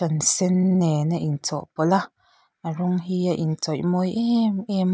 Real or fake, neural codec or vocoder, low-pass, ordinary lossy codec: real; none; none; none